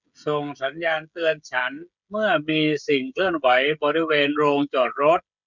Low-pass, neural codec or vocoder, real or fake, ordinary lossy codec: 7.2 kHz; codec, 16 kHz, 16 kbps, FreqCodec, smaller model; fake; none